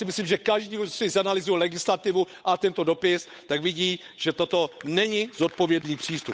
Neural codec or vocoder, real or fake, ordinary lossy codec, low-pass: codec, 16 kHz, 8 kbps, FunCodec, trained on Chinese and English, 25 frames a second; fake; none; none